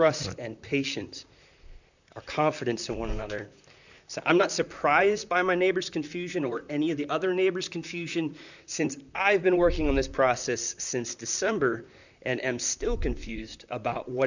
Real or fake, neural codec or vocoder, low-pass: fake; vocoder, 44.1 kHz, 128 mel bands, Pupu-Vocoder; 7.2 kHz